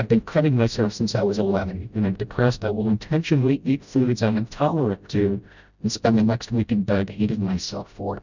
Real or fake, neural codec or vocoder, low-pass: fake; codec, 16 kHz, 0.5 kbps, FreqCodec, smaller model; 7.2 kHz